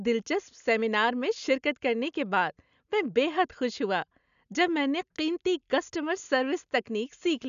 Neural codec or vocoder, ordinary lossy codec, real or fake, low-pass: none; none; real; 7.2 kHz